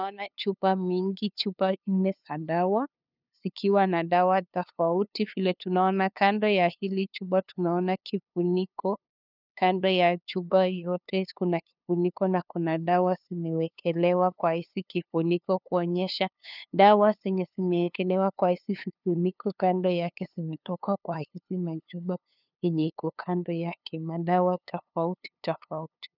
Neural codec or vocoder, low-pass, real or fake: codec, 16 kHz, 2 kbps, FunCodec, trained on LibriTTS, 25 frames a second; 5.4 kHz; fake